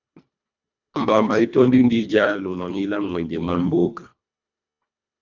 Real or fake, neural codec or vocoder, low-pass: fake; codec, 24 kHz, 1.5 kbps, HILCodec; 7.2 kHz